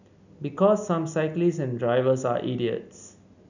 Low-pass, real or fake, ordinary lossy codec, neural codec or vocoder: 7.2 kHz; real; none; none